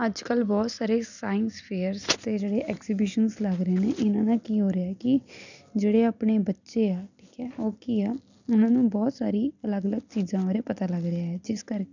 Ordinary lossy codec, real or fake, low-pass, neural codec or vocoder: none; real; 7.2 kHz; none